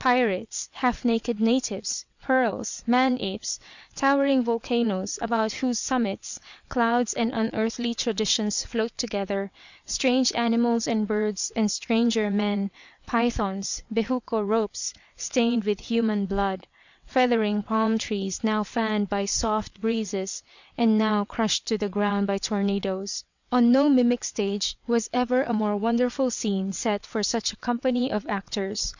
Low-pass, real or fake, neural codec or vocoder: 7.2 kHz; fake; vocoder, 22.05 kHz, 80 mel bands, WaveNeXt